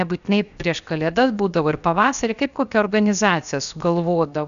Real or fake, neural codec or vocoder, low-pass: fake; codec, 16 kHz, 0.7 kbps, FocalCodec; 7.2 kHz